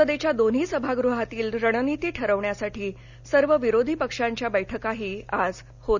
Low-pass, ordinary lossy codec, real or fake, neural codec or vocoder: 7.2 kHz; none; real; none